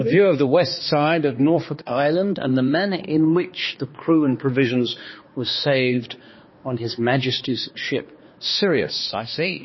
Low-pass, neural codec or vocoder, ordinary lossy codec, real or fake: 7.2 kHz; codec, 16 kHz, 2 kbps, X-Codec, HuBERT features, trained on balanced general audio; MP3, 24 kbps; fake